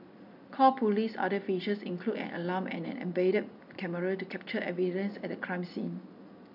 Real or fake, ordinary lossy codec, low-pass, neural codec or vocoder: real; none; 5.4 kHz; none